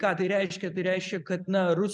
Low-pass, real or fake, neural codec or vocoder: 10.8 kHz; real; none